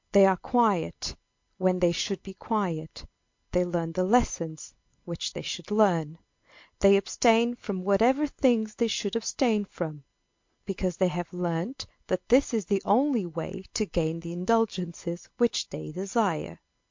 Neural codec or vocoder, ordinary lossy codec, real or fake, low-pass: none; MP3, 48 kbps; real; 7.2 kHz